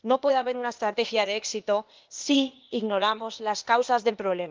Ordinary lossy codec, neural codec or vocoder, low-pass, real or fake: Opus, 24 kbps; codec, 16 kHz, 0.8 kbps, ZipCodec; 7.2 kHz; fake